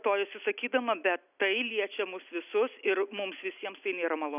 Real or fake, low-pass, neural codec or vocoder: real; 3.6 kHz; none